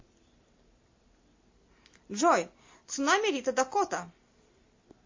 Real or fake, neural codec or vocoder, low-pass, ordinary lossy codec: real; none; 7.2 kHz; MP3, 32 kbps